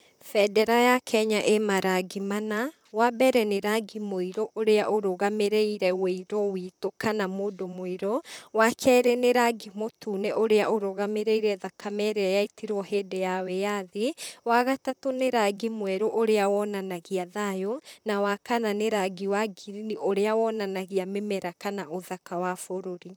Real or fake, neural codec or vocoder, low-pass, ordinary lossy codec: fake; vocoder, 44.1 kHz, 128 mel bands, Pupu-Vocoder; none; none